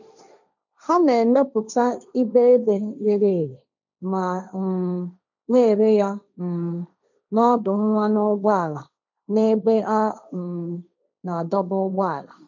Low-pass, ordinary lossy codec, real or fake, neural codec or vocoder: 7.2 kHz; none; fake; codec, 16 kHz, 1.1 kbps, Voila-Tokenizer